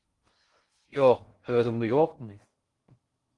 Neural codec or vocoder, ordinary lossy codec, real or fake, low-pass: codec, 16 kHz in and 24 kHz out, 0.6 kbps, FocalCodec, streaming, 4096 codes; Opus, 24 kbps; fake; 10.8 kHz